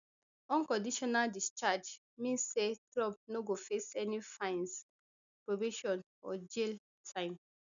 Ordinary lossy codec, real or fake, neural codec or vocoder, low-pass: none; real; none; 7.2 kHz